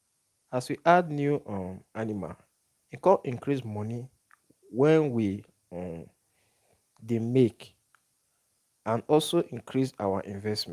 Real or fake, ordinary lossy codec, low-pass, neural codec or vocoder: real; Opus, 32 kbps; 14.4 kHz; none